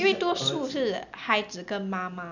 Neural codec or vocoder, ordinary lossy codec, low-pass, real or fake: none; none; 7.2 kHz; real